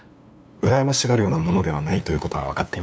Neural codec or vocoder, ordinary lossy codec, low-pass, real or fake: codec, 16 kHz, 2 kbps, FunCodec, trained on LibriTTS, 25 frames a second; none; none; fake